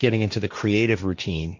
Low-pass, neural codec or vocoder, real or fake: 7.2 kHz; codec, 16 kHz, 1.1 kbps, Voila-Tokenizer; fake